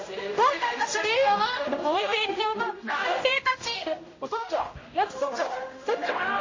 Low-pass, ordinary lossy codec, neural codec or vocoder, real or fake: 7.2 kHz; MP3, 32 kbps; codec, 16 kHz, 0.5 kbps, X-Codec, HuBERT features, trained on general audio; fake